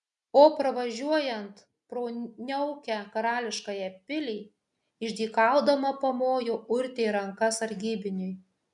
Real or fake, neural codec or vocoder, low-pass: real; none; 10.8 kHz